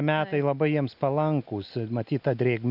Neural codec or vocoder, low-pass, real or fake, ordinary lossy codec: none; 5.4 kHz; real; AAC, 48 kbps